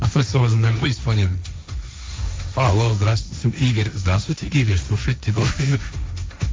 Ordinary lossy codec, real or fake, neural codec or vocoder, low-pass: none; fake; codec, 16 kHz, 1.1 kbps, Voila-Tokenizer; none